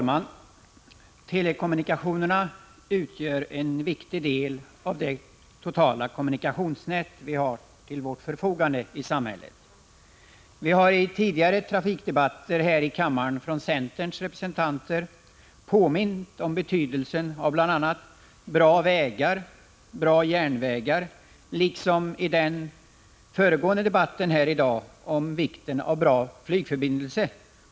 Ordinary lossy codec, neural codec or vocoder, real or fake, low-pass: none; none; real; none